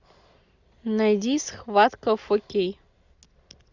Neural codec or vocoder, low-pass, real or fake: none; 7.2 kHz; real